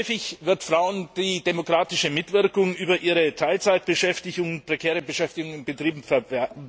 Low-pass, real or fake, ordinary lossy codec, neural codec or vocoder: none; real; none; none